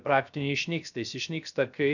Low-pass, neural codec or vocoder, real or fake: 7.2 kHz; codec, 16 kHz, 0.3 kbps, FocalCodec; fake